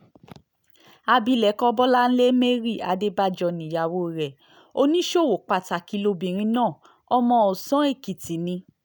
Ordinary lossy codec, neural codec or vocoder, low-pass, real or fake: none; none; none; real